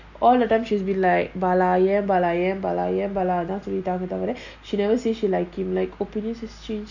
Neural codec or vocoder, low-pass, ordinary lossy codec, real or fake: none; 7.2 kHz; none; real